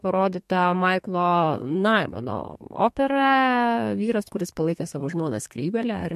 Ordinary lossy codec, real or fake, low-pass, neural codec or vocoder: MP3, 64 kbps; fake; 14.4 kHz; codec, 32 kHz, 1.9 kbps, SNAC